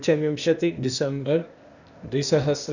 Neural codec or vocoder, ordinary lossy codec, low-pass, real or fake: codec, 16 kHz, 0.8 kbps, ZipCodec; none; 7.2 kHz; fake